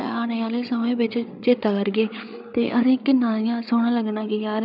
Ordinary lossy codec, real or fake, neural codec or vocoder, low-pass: none; fake; codec, 16 kHz, 8 kbps, FreqCodec, larger model; 5.4 kHz